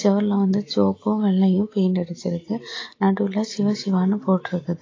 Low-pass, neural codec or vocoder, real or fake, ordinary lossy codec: 7.2 kHz; none; real; AAC, 32 kbps